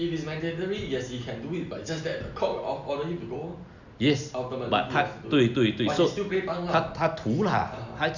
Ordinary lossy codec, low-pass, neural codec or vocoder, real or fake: none; 7.2 kHz; none; real